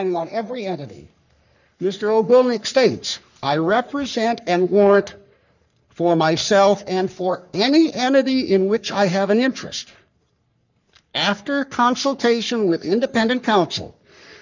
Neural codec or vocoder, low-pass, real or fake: codec, 44.1 kHz, 3.4 kbps, Pupu-Codec; 7.2 kHz; fake